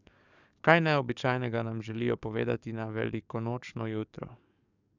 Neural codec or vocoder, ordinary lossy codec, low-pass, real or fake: codec, 44.1 kHz, 7.8 kbps, DAC; none; 7.2 kHz; fake